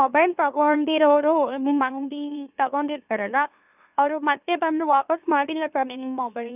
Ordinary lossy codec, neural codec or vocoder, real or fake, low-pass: none; autoencoder, 44.1 kHz, a latent of 192 numbers a frame, MeloTTS; fake; 3.6 kHz